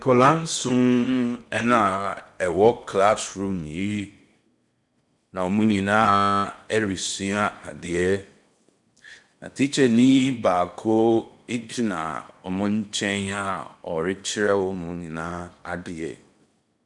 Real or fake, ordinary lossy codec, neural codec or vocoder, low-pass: fake; MP3, 96 kbps; codec, 16 kHz in and 24 kHz out, 0.8 kbps, FocalCodec, streaming, 65536 codes; 10.8 kHz